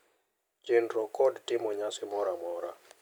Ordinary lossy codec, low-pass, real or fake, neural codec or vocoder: none; none; real; none